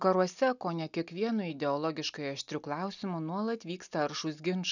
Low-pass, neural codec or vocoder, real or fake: 7.2 kHz; none; real